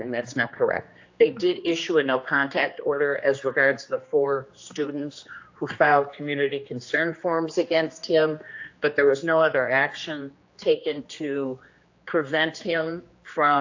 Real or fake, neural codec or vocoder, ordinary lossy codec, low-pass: fake; codec, 16 kHz, 2 kbps, X-Codec, HuBERT features, trained on general audio; AAC, 48 kbps; 7.2 kHz